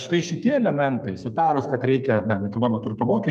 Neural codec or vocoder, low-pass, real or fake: codec, 32 kHz, 1.9 kbps, SNAC; 14.4 kHz; fake